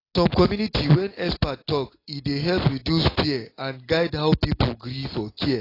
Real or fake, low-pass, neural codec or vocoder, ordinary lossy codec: real; 5.4 kHz; none; AAC, 24 kbps